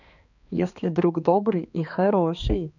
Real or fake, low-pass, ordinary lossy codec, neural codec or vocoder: fake; 7.2 kHz; none; codec, 16 kHz, 2 kbps, X-Codec, HuBERT features, trained on balanced general audio